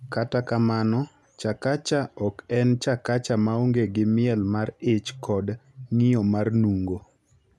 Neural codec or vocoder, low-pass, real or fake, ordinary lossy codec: none; none; real; none